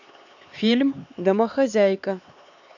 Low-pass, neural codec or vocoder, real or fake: 7.2 kHz; codec, 16 kHz, 4 kbps, X-Codec, HuBERT features, trained on LibriSpeech; fake